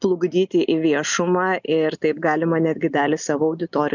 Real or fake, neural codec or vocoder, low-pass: real; none; 7.2 kHz